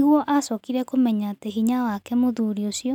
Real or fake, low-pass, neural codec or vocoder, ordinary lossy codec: real; 19.8 kHz; none; none